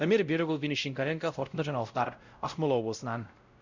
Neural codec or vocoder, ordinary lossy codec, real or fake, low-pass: codec, 16 kHz, 0.5 kbps, X-Codec, WavLM features, trained on Multilingual LibriSpeech; Opus, 64 kbps; fake; 7.2 kHz